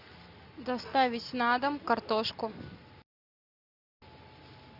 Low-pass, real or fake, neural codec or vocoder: 5.4 kHz; real; none